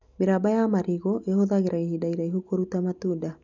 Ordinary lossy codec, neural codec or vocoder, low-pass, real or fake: none; none; 7.2 kHz; real